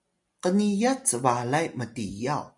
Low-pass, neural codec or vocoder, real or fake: 10.8 kHz; none; real